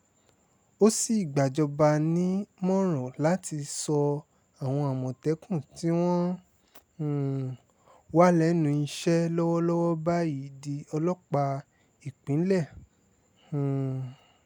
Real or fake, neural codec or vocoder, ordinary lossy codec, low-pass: real; none; none; 19.8 kHz